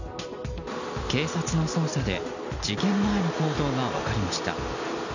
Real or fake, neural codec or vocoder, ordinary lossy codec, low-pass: real; none; none; 7.2 kHz